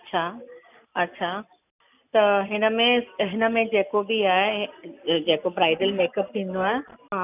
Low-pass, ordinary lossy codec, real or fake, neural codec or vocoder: 3.6 kHz; none; real; none